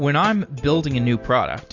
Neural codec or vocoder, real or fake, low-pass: none; real; 7.2 kHz